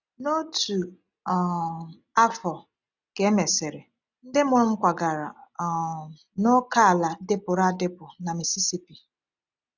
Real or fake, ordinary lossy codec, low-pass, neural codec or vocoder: real; none; 7.2 kHz; none